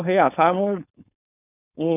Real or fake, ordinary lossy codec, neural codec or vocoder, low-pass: fake; none; codec, 16 kHz, 4.8 kbps, FACodec; 3.6 kHz